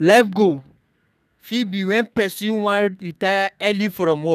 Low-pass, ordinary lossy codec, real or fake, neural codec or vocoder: 14.4 kHz; none; fake; codec, 32 kHz, 1.9 kbps, SNAC